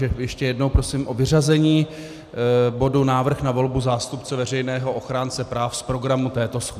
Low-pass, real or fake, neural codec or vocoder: 14.4 kHz; real; none